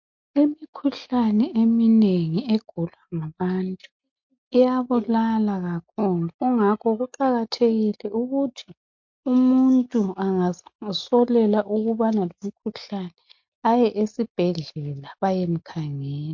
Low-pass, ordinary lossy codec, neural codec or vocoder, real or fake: 7.2 kHz; MP3, 48 kbps; none; real